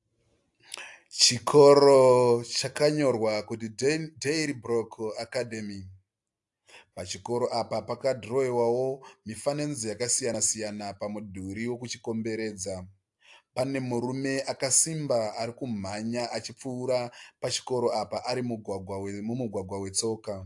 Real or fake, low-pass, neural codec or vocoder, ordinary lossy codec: real; 10.8 kHz; none; AAC, 64 kbps